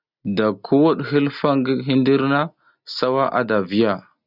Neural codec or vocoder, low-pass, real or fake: none; 5.4 kHz; real